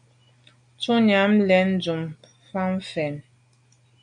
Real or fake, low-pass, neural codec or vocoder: real; 9.9 kHz; none